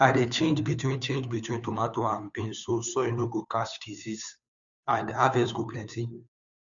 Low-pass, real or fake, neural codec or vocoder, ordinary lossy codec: 7.2 kHz; fake; codec, 16 kHz, 2 kbps, FunCodec, trained on Chinese and English, 25 frames a second; none